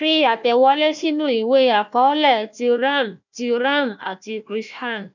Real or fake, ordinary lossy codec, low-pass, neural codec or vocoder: fake; none; 7.2 kHz; codec, 16 kHz, 1 kbps, FunCodec, trained on Chinese and English, 50 frames a second